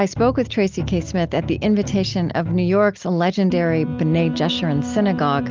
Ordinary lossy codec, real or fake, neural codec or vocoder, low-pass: Opus, 32 kbps; real; none; 7.2 kHz